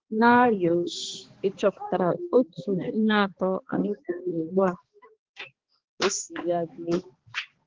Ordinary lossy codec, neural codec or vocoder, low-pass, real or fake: Opus, 16 kbps; codec, 16 kHz, 2 kbps, X-Codec, HuBERT features, trained on balanced general audio; 7.2 kHz; fake